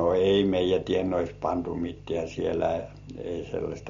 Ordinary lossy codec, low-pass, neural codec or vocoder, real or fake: MP3, 48 kbps; 7.2 kHz; none; real